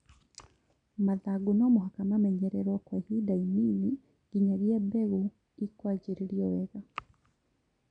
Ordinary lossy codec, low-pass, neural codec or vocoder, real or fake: none; 9.9 kHz; none; real